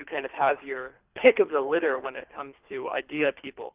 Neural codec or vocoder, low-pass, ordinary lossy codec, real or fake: codec, 24 kHz, 3 kbps, HILCodec; 3.6 kHz; Opus, 24 kbps; fake